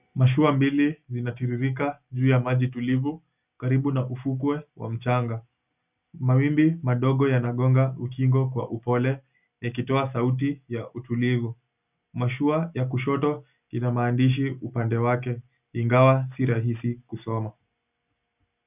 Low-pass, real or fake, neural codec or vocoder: 3.6 kHz; real; none